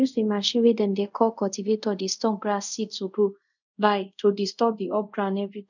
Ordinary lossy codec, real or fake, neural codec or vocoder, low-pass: none; fake; codec, 24 kHz, 0.5 kbps, DualCodec; 7.2 kHz